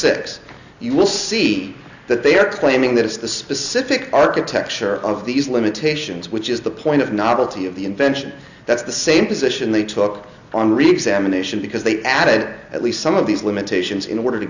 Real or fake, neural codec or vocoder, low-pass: real; none; 7.2 kHz